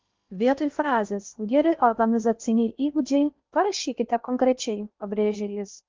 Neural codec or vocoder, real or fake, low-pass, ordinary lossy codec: codec, 16 kHz in and 24 kHz out, 0.8 kbps, FocalCodec, streaming, 65536 codes; fake; 7.2 kHz; Opus, 24 kbps